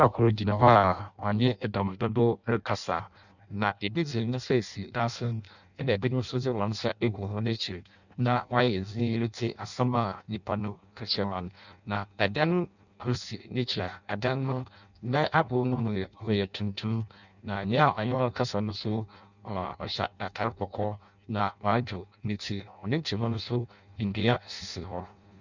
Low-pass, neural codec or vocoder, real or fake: 7.2 kHz; codec, 16 kHz in and 24 kHz out, 0.6 kbps, FireRedTTS-2 codec; fake